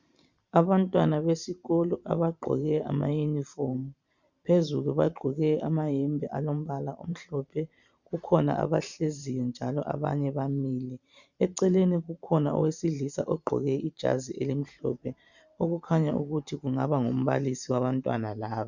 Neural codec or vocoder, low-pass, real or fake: vocoder, 44.1 kHz, 128 mel bands every 512 samples, BigVGAN v2; 7.2 kHz; fake